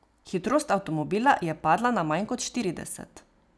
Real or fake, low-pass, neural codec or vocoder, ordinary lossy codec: real; none; none; none